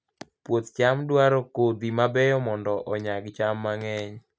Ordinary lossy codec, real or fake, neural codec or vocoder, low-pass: none; real; none; none